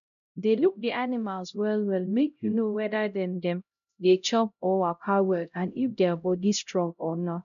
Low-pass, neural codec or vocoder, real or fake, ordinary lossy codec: 7.2 kHz; codec, 16 kHz, 0.5 kbps, X-Codec, HuBERT features, trained on LibriSpeech; fake; none